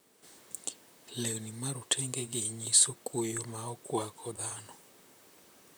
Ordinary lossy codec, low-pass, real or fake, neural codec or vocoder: none; none; fake; vocoder, 44.1 kHz, 128 mel bands, Pupu-Vocoder